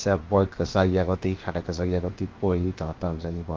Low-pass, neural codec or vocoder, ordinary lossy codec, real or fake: 7.2 kHz; codec, 16 kHz, 0.7 kbps, FocalCodec; Opus, 32 kbps; fake